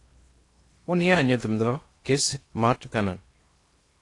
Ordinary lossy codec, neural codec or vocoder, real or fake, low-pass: AAC, 48 kbps; codec, 16 kHz in and 24 kHz out, 0.8 kbps, FocalCodec, streaming, 65536 codes; fake; 10.8 kHz